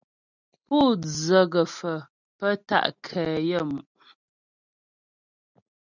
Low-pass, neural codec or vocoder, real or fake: 7.2 kHz; none; real